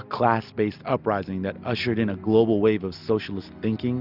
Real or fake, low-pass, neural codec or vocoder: real; 5.4 kHz; none